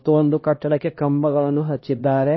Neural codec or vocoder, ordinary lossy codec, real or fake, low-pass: codec, 16 kHz, 1 kbps, X-Codec, HuBERT features, trained on LibriSpeech; MP3, 24 kbps; fake; 7.2 kHz